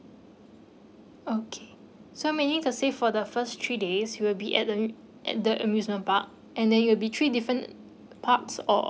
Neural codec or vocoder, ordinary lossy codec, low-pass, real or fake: none; none; none; real